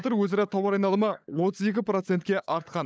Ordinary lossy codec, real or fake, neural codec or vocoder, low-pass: none; fake; codec, 16 kHz, 16 kbps, FunCodec, trained on LibriTTS, 50 frames a second; none